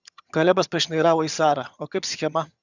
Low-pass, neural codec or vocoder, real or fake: 7.2 kHz; vocoder, 22.05 kHz, 80 mel bands, HiFi-GAN; fake